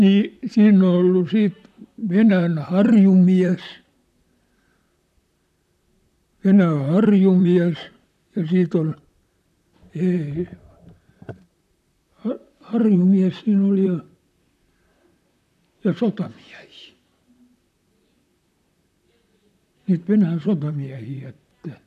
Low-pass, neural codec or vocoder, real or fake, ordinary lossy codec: 14.4 kHz; vocoder, 44.1 kHz, 128 mel bands, Pupu-Vocoder; fake; none